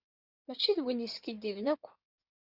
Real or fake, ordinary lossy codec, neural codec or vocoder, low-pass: fake; Opus, 64 kbps; codec, 16 kHz in and 24 kHz out, 2.2 kbps, FireRedTTS-2 codec; 5.4 kHz